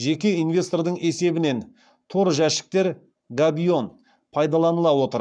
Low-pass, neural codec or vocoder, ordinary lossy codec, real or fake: 9.9 kHz; codec, 44.1 kHz, 7.8 kbps, Pupu-Codec; none; fake